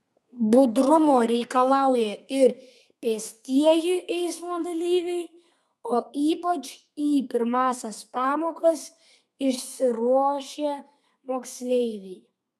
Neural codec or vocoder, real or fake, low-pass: codec, 32 kHz, 1.9 kbps, SNAC; fake; 14.4 kHz